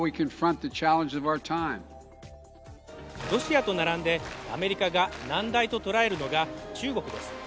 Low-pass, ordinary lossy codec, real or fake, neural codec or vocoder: none; none; real; none